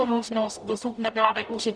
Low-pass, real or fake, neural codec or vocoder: 9.9 kHz; fake; codec, 44.1 kHz, 0.9 kbps, DAC